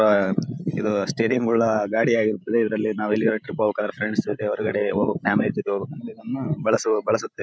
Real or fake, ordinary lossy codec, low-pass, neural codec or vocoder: fake; none; none; codec, 16 kHz, 16 kbps, FreqCodec, larger model